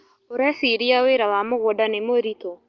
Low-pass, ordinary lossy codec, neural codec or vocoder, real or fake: 7.2 kHz; Opus, 32 kbps; none; real